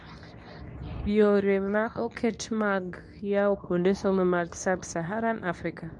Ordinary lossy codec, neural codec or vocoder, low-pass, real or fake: none; codec, 24 kHz, 0.9 kbps, WavTokenizer, medium speech release version 2; 10.8 kHz; fake